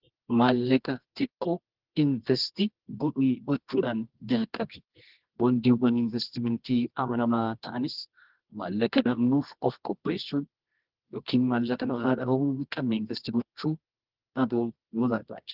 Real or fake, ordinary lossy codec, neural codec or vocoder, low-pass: fake; Opus, 16 kbps; codec, 24 kHz, 0.9 kbps, WavTokenizer, medium music audio release; 5.4 kHz